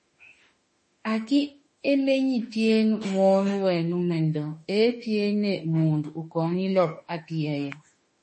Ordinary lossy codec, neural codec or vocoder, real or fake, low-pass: MP3, 32 kbps; autoencoder, 48 kHz, 32 numbers a frame, DAC-VAE, trained on Japanese speech; fake; 10.8 kHz